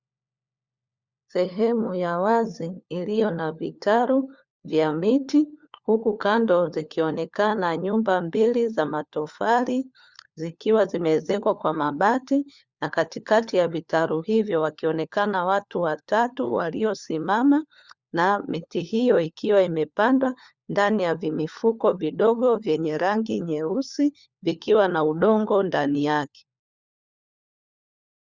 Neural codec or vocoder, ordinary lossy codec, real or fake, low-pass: codec, 16 kHz, 4 kbps, FunCodec, trained on LibriTTS, 50 frames a second; Opus, 64 kbps; fake; 7.2 kHz